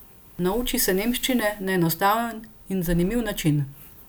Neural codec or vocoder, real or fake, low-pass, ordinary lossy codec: none; real; none; none